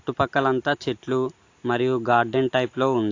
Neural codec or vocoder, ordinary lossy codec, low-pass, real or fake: none; AAC, 48 kbps; 7.2 kHz; real